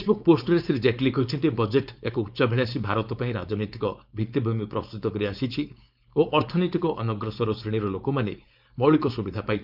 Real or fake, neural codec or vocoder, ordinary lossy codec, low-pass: fake; codec, 16 kHz, 4.8 kbps, FACodec; none; 5.4 kHz